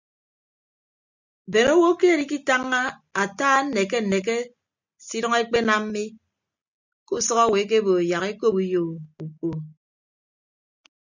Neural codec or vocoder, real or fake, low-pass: none; real; 7.2 kHz